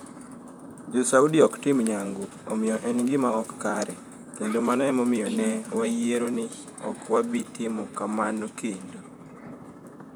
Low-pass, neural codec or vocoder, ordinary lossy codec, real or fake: none; vocoder, 44.1 kHz, 128 mel bands, Pupu-Vocoder; none; fake